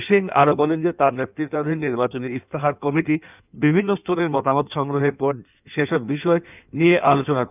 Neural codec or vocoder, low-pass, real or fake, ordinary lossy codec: codec, 16 kHz in and 24 kHz out, 1.1 kbps, FireRedTTS-2 codec; 3.6 kHz; fake; none